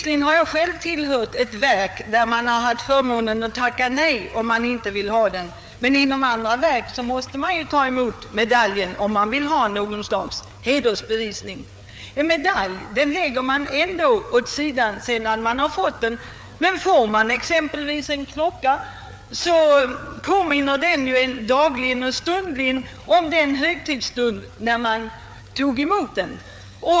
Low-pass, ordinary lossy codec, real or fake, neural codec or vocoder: none; none; fake; codec, 16 kHz, 4 kbps, FreqCodec, larger model